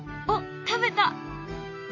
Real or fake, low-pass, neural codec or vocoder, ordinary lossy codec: fake; 7.2 kHz; autoencoder, 48 kHz, 128 numbers a frame, DAC-VAE, trained on Japanese speech; none